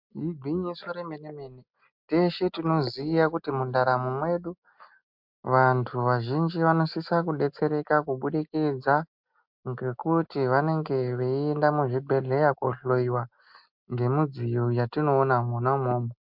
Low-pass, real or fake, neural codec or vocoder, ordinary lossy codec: 5.4 kHz; real; none; MP3, 48 kbps